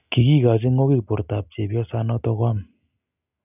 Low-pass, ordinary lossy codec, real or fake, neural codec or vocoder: 3.6 kHz; none; real; none